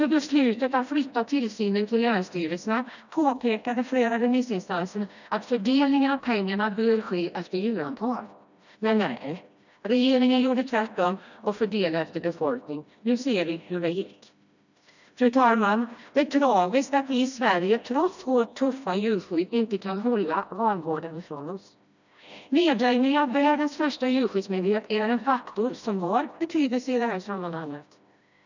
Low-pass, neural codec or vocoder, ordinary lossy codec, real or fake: 7.2 kHz; codec, 16 kHz, 1 kbps, FreqCodec, smaller model; none; fake